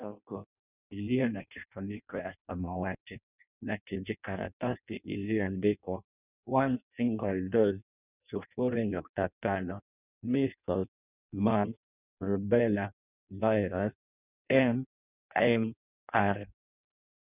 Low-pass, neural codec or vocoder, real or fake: 3.6 kHz; codec, 16 kHz in and 24 kHz out, 0.6 kbps, FireRedTTS-2 codec; fake